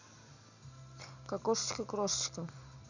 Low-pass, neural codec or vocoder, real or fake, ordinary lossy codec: 7.2 kHz; none; real; none